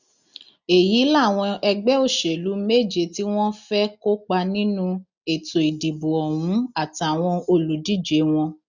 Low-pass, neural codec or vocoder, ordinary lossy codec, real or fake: 7.2 kHz; none; none; real